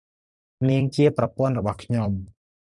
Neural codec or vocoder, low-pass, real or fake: vocoder, 44.1 kHz, 128 mel bands every 256 samples, BigVGAN v2; 10.8 kHz; fake